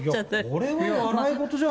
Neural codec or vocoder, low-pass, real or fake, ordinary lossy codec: none; none; real; none